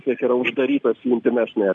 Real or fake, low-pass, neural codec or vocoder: real; 10.8 kHz; none